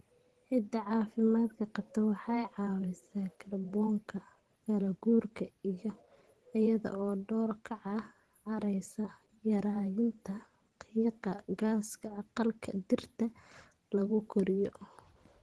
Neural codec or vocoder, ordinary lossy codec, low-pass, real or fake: vocoder, 44.1 kHz, 128 mel bands every 512 samples, BigVGAN v2; Opus, 16 kbps; 10.8 kHz; fake